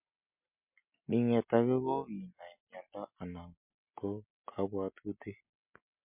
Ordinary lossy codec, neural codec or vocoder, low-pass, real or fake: MP3, 32 kbps; none; 3.6 kHz; real